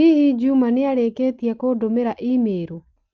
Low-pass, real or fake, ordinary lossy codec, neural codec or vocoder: 7.2 kHz; real; Opus, 24 kbps; none